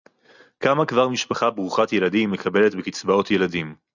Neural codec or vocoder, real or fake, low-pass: none; real; 7.2 kHz